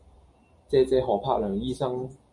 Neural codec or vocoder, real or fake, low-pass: none; real; 10.8 kHz